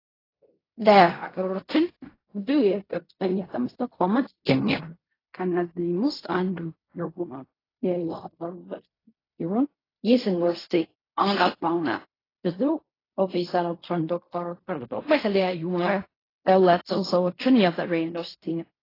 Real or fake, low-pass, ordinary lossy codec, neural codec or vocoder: fake; 5.4 kHz; AAC, 24 kbps; codec, 16 kHz in and 24 kHz out, 0.4 kbps, LongCat-Audio-Codec, fine tuned four codebook decoder